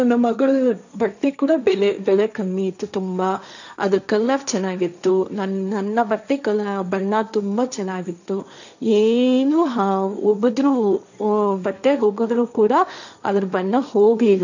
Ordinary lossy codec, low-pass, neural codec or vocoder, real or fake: none; 7.2 kHz; codec, 16 kHz, 1.1 kbps, Voila-Tokenizer; fake